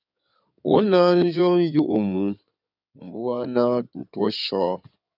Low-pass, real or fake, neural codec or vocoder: 5.4 kHz; fake; codec, 16 kHz in and 24 kHz out, 2.2 kbps, FireRedTTS-2 codec